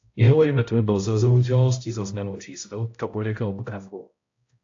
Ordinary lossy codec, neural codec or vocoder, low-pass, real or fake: AAC, 48 kbps; codec, 16 kHz, 0.5 kbps, X-Codec, HuBERT features, trained on balanced general audio; 7.2 kHz; fake